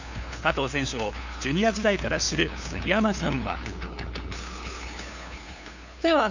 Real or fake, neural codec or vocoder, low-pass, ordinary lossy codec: fake; codec, 16 kHz, 2 kbps, FunCodec, trained on LibriTTS, 25 frames a second; 7.2 kHz; none